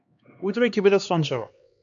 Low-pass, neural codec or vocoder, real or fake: 7.2 kHz; codec, 16 kHz, 2 kbps, X-Codec, HuBERT features, trained on LibriSpeech; fake